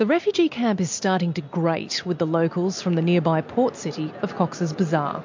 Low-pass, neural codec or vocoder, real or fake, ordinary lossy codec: 7.2 kHz; none; real; MP3, 48 kbps